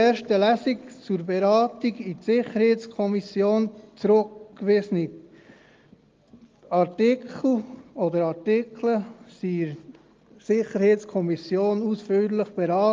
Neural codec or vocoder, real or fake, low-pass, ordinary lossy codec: none; real; 7.2 kHz; Opus, 32 kbps